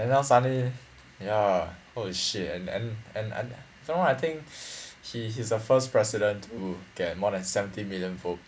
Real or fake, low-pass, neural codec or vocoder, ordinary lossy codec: real; none; none; none